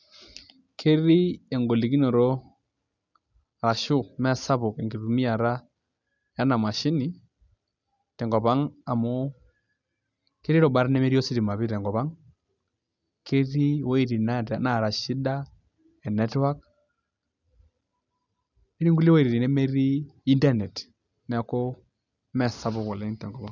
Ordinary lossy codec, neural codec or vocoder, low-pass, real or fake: none; none; 7.2 kHz; real